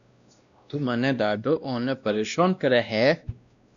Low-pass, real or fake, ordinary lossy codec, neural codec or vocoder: 7.2 kHz; fake; MP3, 96 kbps; codec, 16 kHz, 1 kbps, X-Codec, WavLM features, trained on Multilingual LibriSpeech